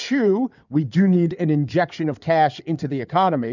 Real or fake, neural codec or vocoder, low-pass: fake; codec, 16 kHz in and 24 kHz out, 2.2 kbps, FireRedTTS-2 codec; 7.2 kHz